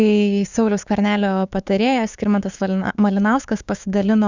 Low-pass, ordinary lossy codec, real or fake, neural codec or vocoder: 7.2 kHz; Opus, 64 kbps; fake; codec, 16 kHz, 6 kbps, DAC